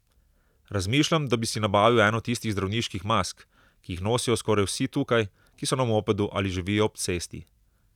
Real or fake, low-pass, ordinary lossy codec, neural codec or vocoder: real; 19.8 kHz; none; none